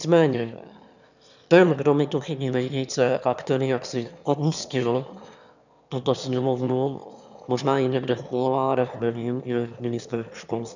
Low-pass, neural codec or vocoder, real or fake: 7.2 kHz; autoencoder, 22.05 kHz, a latent of 192 numbers a frame, VITS, trained on one speaker; fake